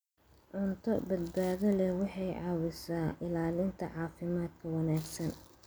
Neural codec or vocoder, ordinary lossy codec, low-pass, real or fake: none; none; none; real